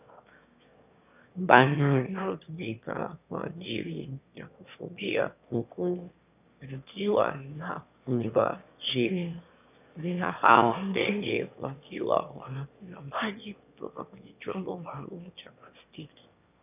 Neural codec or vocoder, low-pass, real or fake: autoencoder, 22.05 kHz, a latent of 192 numbers a frame, VITS, trained on one speaker; 3.6 kHz; fake